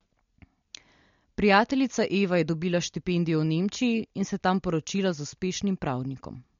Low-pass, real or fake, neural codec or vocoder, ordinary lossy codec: 7.2 kHz; real; none; MP3, 48 kbps